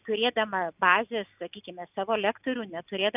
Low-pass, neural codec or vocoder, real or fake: 3.6 kHz; none; real